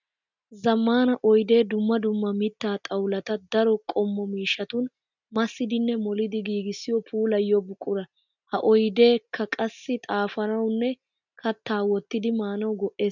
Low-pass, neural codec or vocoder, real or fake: 7.2 kHz; none; real